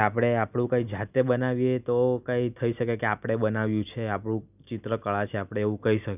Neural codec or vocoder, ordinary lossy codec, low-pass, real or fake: none; none; 3.6 kHz; real